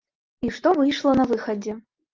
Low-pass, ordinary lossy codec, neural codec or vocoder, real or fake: 7.2 kHz; Opus, 24 kbps; none; real